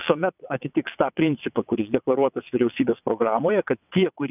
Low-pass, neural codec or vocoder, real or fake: 3.6 kHz; vocoder, 22.05 kHz, 80 mel bands, WaveNeXt; fake